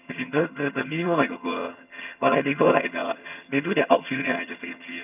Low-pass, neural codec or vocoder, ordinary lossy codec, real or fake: 3.6 kHz; vocoder, 22.05 kHz, 80 mel bands, HiFi-GAN; none; fake